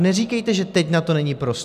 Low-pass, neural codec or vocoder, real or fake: 14.4 kHz; none; real